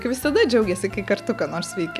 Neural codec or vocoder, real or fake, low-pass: none; real; 14.4 kHz